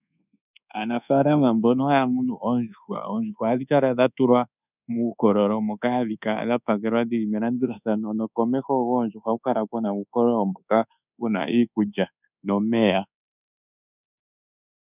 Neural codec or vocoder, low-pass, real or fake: codec, 24 kHz, 1.2 kbps, DualCodec; 3.6 kHz; fake